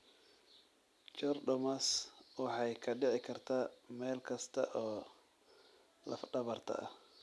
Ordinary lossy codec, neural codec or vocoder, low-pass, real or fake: none; none; none; real